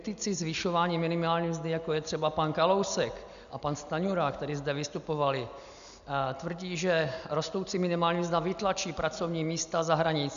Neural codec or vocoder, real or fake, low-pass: none; real; 7.2 kHz